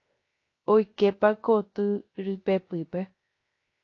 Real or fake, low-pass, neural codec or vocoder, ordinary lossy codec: fake; 7.2 kHz; codec, 16 kHz, 0.3 kbps, FocalCodec; AAC, 32 kbps